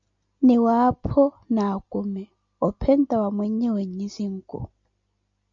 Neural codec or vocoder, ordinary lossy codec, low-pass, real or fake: none; MP3, 64 kbps; 7.2 kHz; real